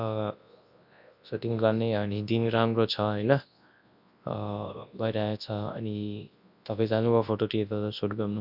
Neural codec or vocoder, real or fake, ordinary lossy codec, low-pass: codec, 24 kHz, 0.9 kbps, WavTokenizer, large speech release; fake; none; 5.4 kHz